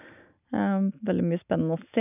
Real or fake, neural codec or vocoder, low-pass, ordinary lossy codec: real; none; 3.6 kHz; none